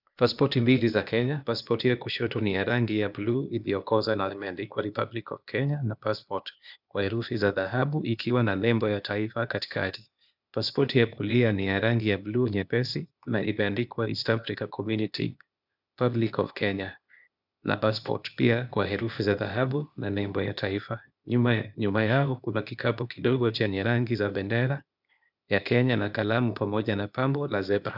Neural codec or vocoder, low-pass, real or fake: codec, 16 kHz, 0.8 kbps, ZipCodec; 5.4 kHz; fake